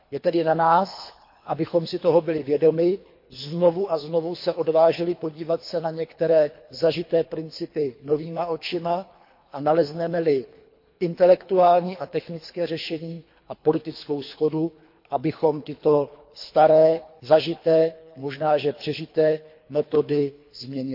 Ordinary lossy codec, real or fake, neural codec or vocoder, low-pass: MP3, 32 kbps; fake; codec, 24 kHz, 3 kbps, HILCodec; 5.4 kHz